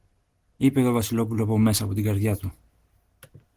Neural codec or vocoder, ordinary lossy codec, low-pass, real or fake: none; Opus, 16 kbps; 14.4 kHz; real